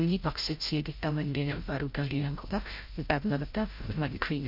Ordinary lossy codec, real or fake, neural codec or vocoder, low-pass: MP3, 32 kbps; fake; codec, 16 kHz, 0.5 kbps, FreqCodec, larger model; 5.4 kHz